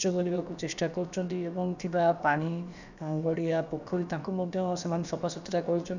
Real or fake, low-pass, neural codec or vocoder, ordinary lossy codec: fake; 7.2 kHz; codec, 16 kHz, about 1 kbps, DyCAST, with the encoder's durations; none